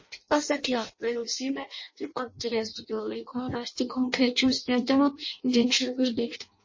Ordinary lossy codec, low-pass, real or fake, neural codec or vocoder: MP3, 32 kbps; 7.2 kHz; fake; codec, 16 kHz in and 24 kHz out, 0.6 kbps, FireRedTTS-2 codec